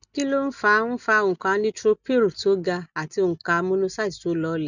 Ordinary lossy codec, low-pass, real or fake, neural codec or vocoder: none; 7.2 kHz; real; none